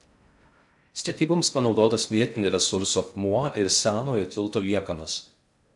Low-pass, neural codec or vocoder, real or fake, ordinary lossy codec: 10.8 kHz; codec, 16 kHz in and 24 kHz out, 0.6 kbps, FocalCodec, streaming, 4096 codes; fake; MP3, 96 kbps